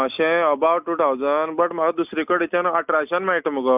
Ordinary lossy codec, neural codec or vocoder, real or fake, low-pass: none; none; real; 3.6 kHz